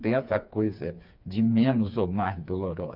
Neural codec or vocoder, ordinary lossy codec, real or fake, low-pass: codec, 16 kHz, 4 kbps, FreqCodec, smaller model; none; fake; 5.4 kHz